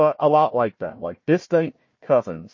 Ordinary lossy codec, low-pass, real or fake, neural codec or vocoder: MP3, 32 kbps; 7.2 kHz; fake; codec, 16 kHz, 1 kbps, FunCodec, trained on Chinese and English, 50 frames a second